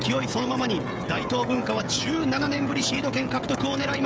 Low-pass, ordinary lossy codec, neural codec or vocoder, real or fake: none; none; codec, 16 kHz, 16 kbps, FreqCodec, smaller model; fake